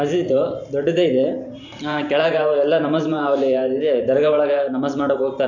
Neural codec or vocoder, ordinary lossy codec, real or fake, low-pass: vocoder, 44.1 kHz, 128 mel bands every 512 samples, BigVGAN v2; none; fake; 7.2 kHz